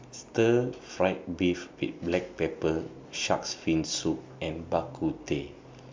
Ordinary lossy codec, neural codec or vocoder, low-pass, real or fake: MP3, 64 kbps; none; 7.2 kHz; real